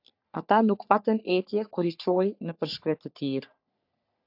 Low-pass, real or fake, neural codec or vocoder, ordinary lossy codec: 5.4 kHz; fake; codec, 44.1 kHz, 3.4 kbps, Pupu-Codec; AAC, 48 kbps